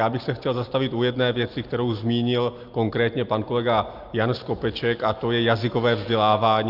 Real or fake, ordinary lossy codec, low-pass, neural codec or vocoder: real; Opus, 24 kbps; 5.4 kHz; none